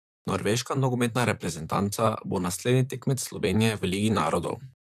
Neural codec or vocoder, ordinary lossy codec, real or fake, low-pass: vocoder, 44.1 kHz, 128 mel bands, Pupu-Vocoder; none; fake; 14.4 kHz